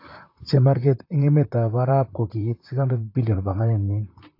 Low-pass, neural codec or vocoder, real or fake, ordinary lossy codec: 5.4 kHz; vocoder, 44.1 kHz, 128 mel bands, Pupu-Vocoder; fake; AAC, 32 kbps